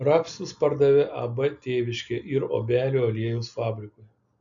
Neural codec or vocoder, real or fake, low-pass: none; real; 7.2 kHz